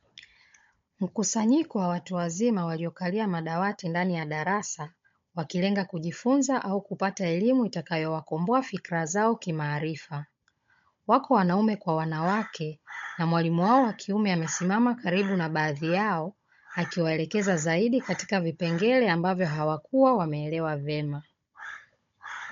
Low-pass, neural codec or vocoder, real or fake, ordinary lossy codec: 7.2 kHz; codec, 16 kHz, 16 kbps, FunCodec, trained on Chinese and English, 50 frames a second; fake; MP3, 48 kbps